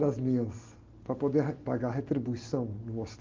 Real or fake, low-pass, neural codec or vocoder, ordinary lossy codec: real; 7.2 kHz; none; Opus, 32 kbps